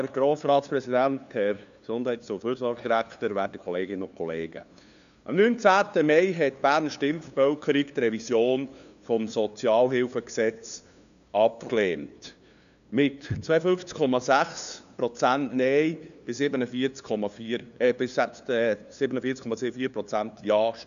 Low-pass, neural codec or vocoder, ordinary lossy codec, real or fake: 7.2 kHz; codec, 16 kHz, 2 kbps, FunCodec, trained on LibriTTS, 25 frames a second; none; fake